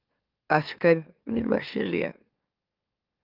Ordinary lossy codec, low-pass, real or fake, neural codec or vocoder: Opus, 32 kbps; 5.4 kHz; fake; autoencoder, 44.1 kHz, a latent of 192 numbers a frame, MeloTTS